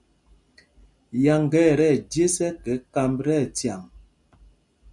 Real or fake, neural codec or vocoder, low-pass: real; none; 10.8 kHz